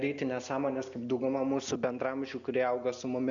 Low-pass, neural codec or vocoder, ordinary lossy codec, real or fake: 7.2 kHz; none; Opus, 64 kbps; real